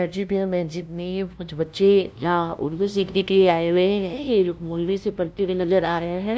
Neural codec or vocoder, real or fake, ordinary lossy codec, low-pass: codec, 16 kHz, 0.5 kbps, FunCodec, trained on LibriTTS, 25 frames a second; fake; none; none